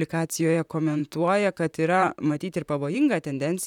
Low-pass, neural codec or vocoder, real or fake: 19.8 kHz; vocoder, 44.1 kHz, 128 mel bands, Pupu-Vocoder; fake